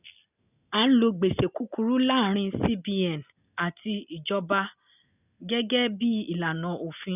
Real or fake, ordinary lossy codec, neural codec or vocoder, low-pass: real; none; none; 3.6 kHz